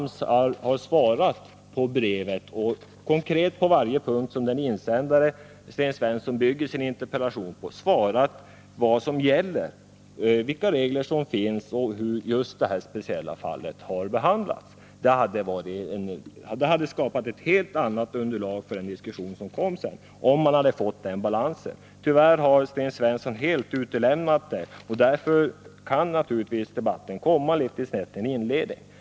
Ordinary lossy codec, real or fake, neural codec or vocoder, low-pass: none; real; none; none